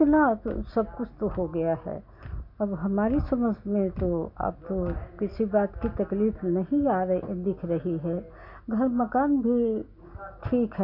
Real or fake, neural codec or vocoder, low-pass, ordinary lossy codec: real; none; 5.4 kHz; none